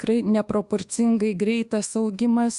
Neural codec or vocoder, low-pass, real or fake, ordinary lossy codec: codec, 24 kHz, 1.2 kbps, DualCodec; 10.8 kHz; fake; Opus, 64 kbps